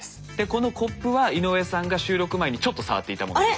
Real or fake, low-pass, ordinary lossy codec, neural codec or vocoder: real; none; none; none